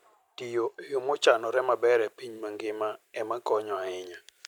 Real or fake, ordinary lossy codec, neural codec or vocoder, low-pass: real; none; none; 19.8 kHz